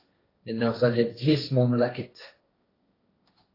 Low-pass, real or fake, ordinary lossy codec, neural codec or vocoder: 5.4 kHz; fake; AAC, 24 kbps; codec, 16 kHz, 1.1 kbps, Voila-Tokenizer